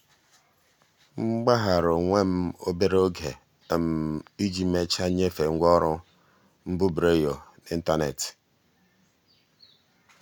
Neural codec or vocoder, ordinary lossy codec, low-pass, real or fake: none; none; none; real